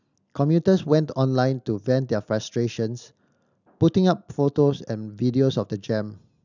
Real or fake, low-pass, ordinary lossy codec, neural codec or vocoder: real; 7.2 kHz; none; none